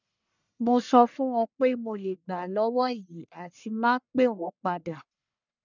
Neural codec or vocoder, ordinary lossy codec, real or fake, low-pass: codec, 44.1 kHz, 1.7 kbps, Pupu-Codec; none; fake; 7.2 kHz